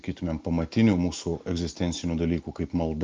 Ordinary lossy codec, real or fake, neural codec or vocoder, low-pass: Opus, 24 kbps; real; none; 7.2 kHz